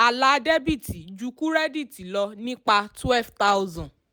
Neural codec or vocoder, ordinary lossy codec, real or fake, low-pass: none; none; real; none